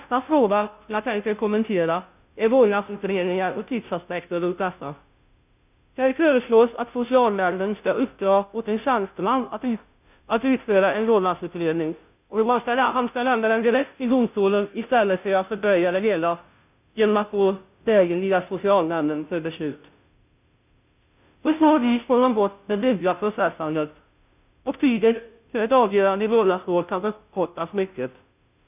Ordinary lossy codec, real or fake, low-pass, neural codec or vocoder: none; fake; 3.6 kHz; codec, 16 kHz, 0.5 kbps, FunCodec, trained on Chinese and English, 25 frames a second